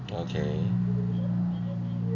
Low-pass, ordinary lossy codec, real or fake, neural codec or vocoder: 7.2 kHz; none; real; none